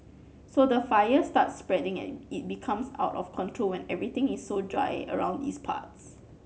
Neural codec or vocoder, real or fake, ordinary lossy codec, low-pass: none; real; none; none